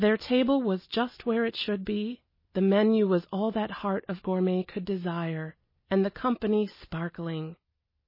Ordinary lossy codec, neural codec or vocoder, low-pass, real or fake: MP3, 24 kbps; none; 5.4 kHz; real